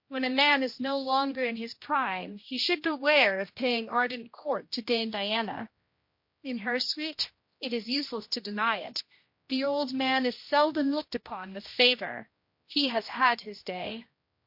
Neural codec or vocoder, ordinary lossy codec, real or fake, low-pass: codec, 16 kHz, 1 kbps, X-Codec, HuBERT features, trained on general audio; MP3, 32 kbps; fake; 5.4 kHz